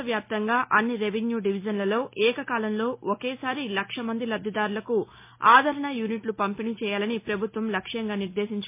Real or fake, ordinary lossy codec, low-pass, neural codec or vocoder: real; MP3, 24 kbps; 3.6 kHz; none